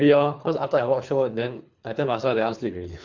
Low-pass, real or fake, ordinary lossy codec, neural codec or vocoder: 7.2 kHz; fake; Opus, 64 kbps; codec, 24 kHz, 3 kbps, HILCodec